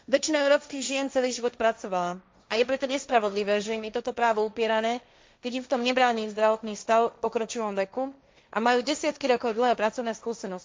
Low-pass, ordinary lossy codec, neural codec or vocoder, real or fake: none; none; codec, 16 kHz, 1.1 kbps, Voila-Tokenizer; fake